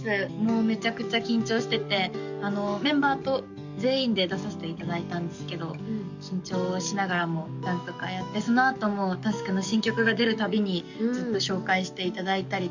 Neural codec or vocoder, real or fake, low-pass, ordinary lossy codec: codec, 44.1 kHz, 7.8 kbps, DAC; fake; 7.2 kHz; none